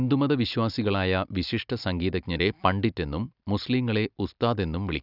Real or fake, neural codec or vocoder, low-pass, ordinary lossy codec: real; none; 5.4 kHz; none